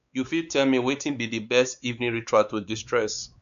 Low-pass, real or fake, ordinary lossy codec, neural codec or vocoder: 7.2 kHz; fake; none; codec, 16 kHz, 4 kbps, X-Codec, WavLM features, trained on Multilingual LibriSpeech